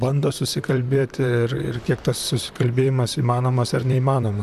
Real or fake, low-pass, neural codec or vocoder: fake; 14.4 kHz; vocoder, 44.1 kHz, 128 mel bands, Pupu-Vocoder